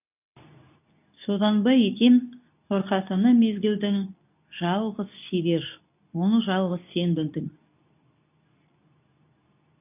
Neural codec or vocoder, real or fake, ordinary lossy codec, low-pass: codec, 24 kHz, 0.9 kbps, WavTokenizer, medium speech release version 2; fake; none; 3.6 kHz